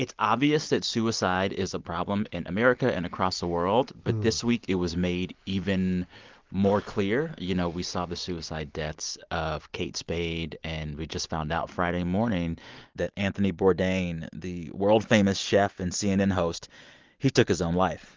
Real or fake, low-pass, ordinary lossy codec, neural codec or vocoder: real; 7.2 kHz; Opus, 32 kbps; none